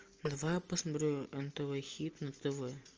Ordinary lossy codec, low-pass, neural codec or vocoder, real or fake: Opus, 24 kbps; 7.2 kHz; none; real